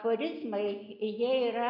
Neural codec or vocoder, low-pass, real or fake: none; 5.4 kHz; real